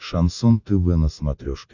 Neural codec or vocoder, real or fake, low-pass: none; real; 7.2 kHz